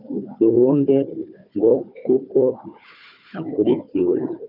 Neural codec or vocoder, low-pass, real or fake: codec, 16 kHz, 4 kbps, FunCodec, trained on Chinese and English, 50 frames a second; 5.4 kHz; fake